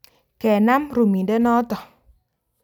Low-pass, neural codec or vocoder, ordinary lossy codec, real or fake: 19.8 kHz; none; none; real